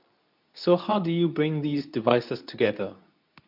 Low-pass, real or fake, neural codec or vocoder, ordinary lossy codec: 5.4 kHz; fake; codec, 24 kHz, 0.9 kbps, WavTokenizer, medium speech release version 2; none